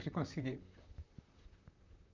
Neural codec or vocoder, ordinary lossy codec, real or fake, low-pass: vocoder, 44.1 kHz, 128 mel bands, Pupu-Vocoder; MP3, 64 kbps; fake; 7.2 kHz